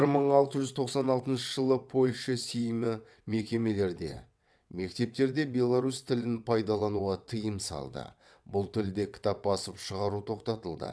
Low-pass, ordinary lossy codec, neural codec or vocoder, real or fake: none; none; vocoder, 22.05 kHz, 80 mel bands, WaveNeXt; fake